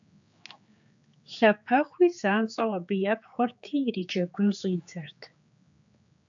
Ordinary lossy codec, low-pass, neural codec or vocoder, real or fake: AAC, 64 kbps; 7.2 kHz; codec, 16 kHz, 4 kbps, X-Codec, HuBERT features, trained on general audio; fake